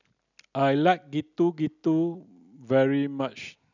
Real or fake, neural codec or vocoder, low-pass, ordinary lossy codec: real; none; 7.2 kHz; none